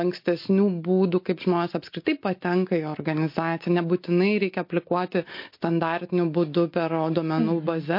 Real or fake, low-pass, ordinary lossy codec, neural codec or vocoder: real; 5.4 kHz; MP3, 32 kbps; none